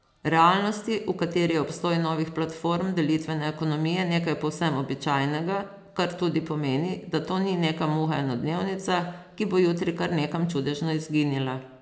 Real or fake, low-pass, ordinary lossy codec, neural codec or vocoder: real; none; none; none